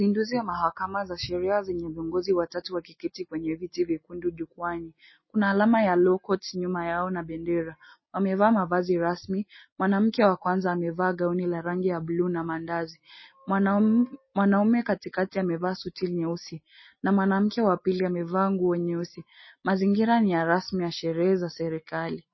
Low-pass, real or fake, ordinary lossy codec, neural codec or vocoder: 7.2 kHz; real; MP3, 24 kbps; none